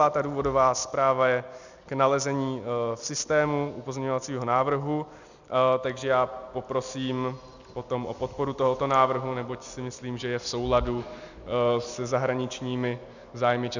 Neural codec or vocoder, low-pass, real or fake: none; 7.2 kHz; real